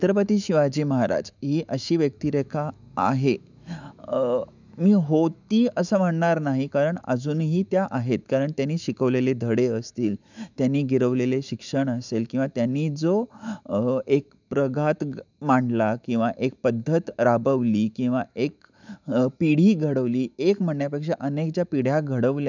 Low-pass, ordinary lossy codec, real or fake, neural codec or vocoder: 7.2 kHz; none; fake; autoencoder, 48 kHz, 128 numbers a frame, DAC-VAE, trained on Japanese speech